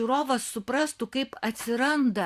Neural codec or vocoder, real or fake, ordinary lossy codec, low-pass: vocoder, 44.1 kHz, 128 mel bands every 512 samples, BigVGAN v2; fake; Opus, 64 kbps; 14.4 kHz